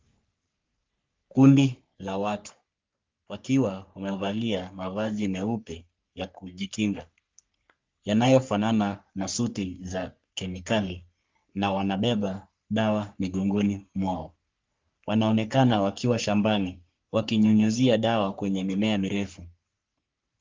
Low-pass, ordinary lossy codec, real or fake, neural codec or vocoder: 7.2 kHz; Opus, 32 kbps; fake; codec, 44.1 kHz, 3.4 kbps, Pupu-Codec